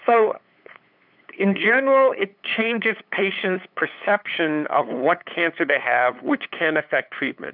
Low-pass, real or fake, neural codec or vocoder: 5.4 kHz; fake; codec, 16 kHz, 8 kbps, FunCodec, trained on LibriTTS, 25 frames a second